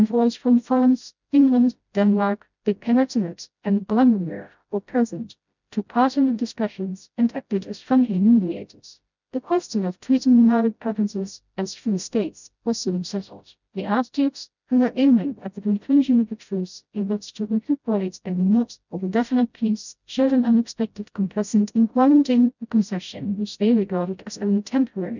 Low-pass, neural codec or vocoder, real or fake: 7.2 kHz; codec, 16 kHz, 0.5 kbps, FreqCodec, smaller model; fake